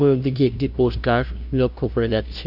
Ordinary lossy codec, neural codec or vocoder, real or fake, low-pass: none; codec, 16 kHz, 0.5 kbps, FunCodec, trained on LibriTTS, 25 frames a second; fake; 5.4 kHz